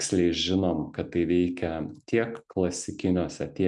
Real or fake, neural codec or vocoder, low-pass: real; none; 10.8 kHz